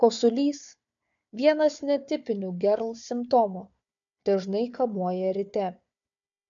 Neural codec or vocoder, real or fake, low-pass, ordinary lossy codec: codec, 16 kHz, 4 kbps, FunCodec, trained on Chinese and English, 50 frames a second; fake; 7.2 kHz; AAC, 64 kbps